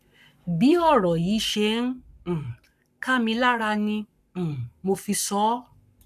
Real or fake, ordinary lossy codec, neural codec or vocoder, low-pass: fake; none; codec, 44.1 kHz, 7.8 kbps, Pupu-Codec; 14.4 kHz